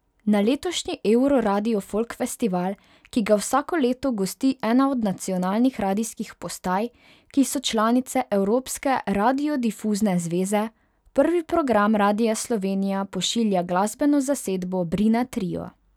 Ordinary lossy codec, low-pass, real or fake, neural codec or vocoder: none; 19.8 kHz; real; none